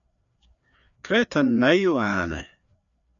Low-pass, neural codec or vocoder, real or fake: 7.2 kHz; codec, 16 kHz, 2 kbps, FreqCodec, larger model; fake